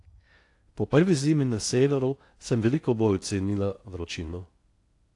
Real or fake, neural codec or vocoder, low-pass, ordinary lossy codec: fake; codec, 16 kHz in and 24 kHz out, 0.6 kbps, FocalCodec, streaming, 2048 codes; 10.8 kHz; AAC, 48 kbps